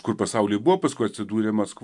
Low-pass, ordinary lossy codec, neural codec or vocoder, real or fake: 10.8 kHz; MP3, 96 kbps; none; real